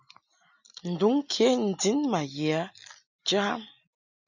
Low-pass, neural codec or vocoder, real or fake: 7.2 kHz; none; real